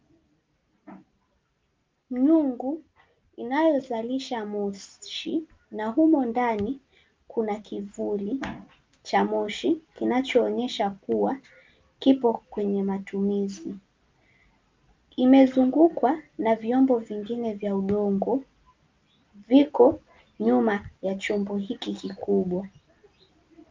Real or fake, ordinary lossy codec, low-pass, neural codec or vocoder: real; Opus, 24 kbps; 7.2 kHz; none